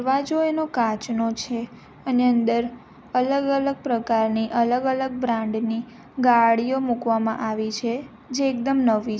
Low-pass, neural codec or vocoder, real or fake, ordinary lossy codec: none; none; real; none